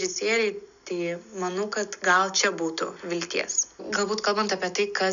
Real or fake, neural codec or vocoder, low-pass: real; none; 7.2 kHz